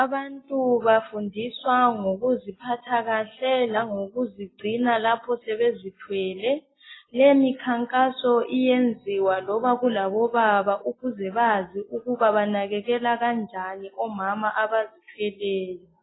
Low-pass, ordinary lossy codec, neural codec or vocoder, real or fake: 7.2 kHz; AAC, 16 kbps; none; real